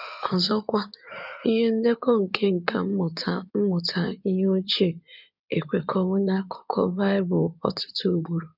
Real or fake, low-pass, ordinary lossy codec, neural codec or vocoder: fake; 5.4 kHz; none; codec, 16 kHz in and 24 kHz out, 2.2 kbps, FireRedTTS-2 codec